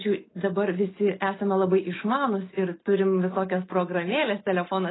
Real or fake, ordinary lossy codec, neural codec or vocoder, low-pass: real; AAC, 16 kbps; none; 7.2 kHz